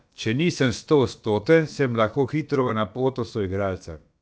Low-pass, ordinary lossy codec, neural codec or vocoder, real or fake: none; none; codec, 16 kHz, about 1 kbps, DyCAST, with the encoder's durations; fake